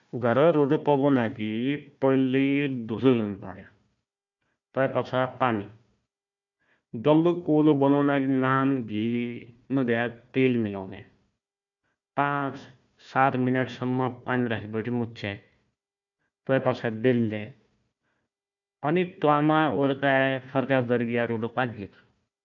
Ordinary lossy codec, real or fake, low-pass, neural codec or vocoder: MP3, 64 kbps; fake; 7.2 kHz; codec, 16 kHz, 1 kbps, FunCodec, trained on Chinese and English, 50 frames a second